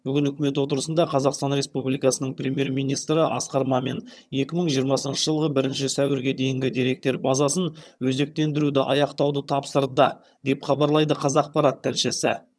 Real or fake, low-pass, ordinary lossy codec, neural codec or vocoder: fake; none; none; vocoder, 22.05 kHz, 80 mel bands, HiFi-GAN